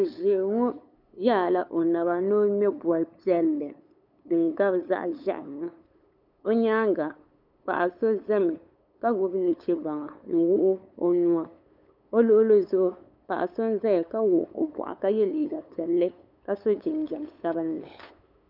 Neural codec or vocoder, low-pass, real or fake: codec, 16 kHz, 8 kbps, FunCodec, trained on LibriTTS, 25 frames a second; 5.4 kHz; fake